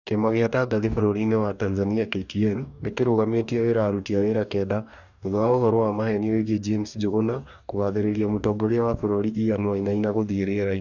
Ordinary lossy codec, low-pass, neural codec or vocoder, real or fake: none; 7.2 kHz; codec, 44.1 kHz, 2.6 kbps, DAC; fake